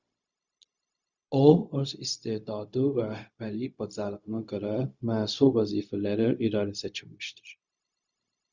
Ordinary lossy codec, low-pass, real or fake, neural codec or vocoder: Opus, 64 kbps; 7.2 kHz; fake; codec, 16 kHz, 0.4 kbps, LongCat-Audio-Codec